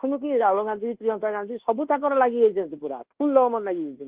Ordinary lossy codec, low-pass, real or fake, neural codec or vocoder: Opus, 16 kbps; 3.6 kHz; fake; codec, 24 kHz, 1.2 kbps, DualCodec